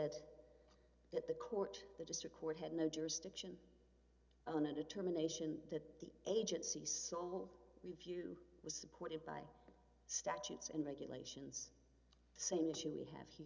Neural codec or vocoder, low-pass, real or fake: none; 7.2 kHz; real